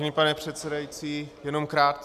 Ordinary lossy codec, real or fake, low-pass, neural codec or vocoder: MP3, 96 kbps; real; 14.4 kHz; none